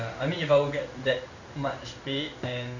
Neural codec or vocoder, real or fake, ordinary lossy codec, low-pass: codec, 16 kHz in and 24 kHz out, 1 kbps, XY-Tokenizer; fake; none; 7.2 kHz